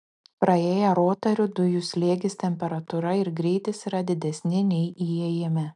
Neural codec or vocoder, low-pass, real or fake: none; 10.8 kHz; real